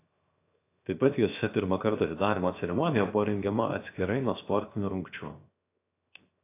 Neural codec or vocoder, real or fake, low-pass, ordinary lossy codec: codec, 16 kHz, 0.7 kbps, FocalCodec; fake; 3.6 kHz; AAC, 24 kbps